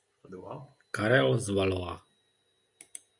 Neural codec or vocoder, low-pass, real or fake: none; 10.8 kHz; real